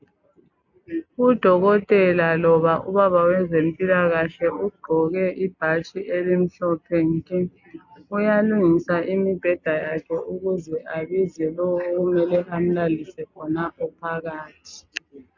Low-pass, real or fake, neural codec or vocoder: 7.2 kHz; real; none